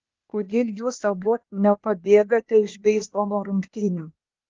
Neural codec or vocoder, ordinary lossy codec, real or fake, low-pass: codec, 16 kHz, 0.8 kbps, ZipCodec; Opus, 24 kbps; fake; 7.2 kHz